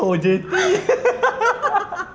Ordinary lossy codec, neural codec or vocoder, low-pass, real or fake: none; none; none; real